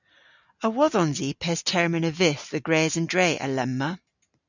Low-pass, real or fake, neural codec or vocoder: 7.2 kHz; real; none